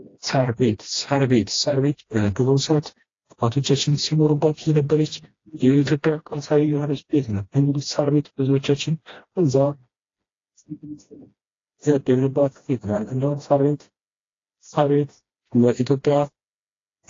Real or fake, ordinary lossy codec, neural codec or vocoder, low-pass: fake; AAC, 32 kbps; codec, 16 kHz, 1 kbps, FreqCodec, smaller model; 7.2 kHz